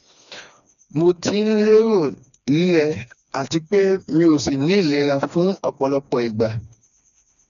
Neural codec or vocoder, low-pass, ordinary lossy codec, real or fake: codec, 16 kHz, 2 kbps, FreqCodec, smaller model; 7.2 kHz; none; fake